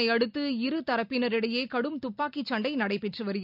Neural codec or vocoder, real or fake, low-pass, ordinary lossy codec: none; real; 5.4 kHz; none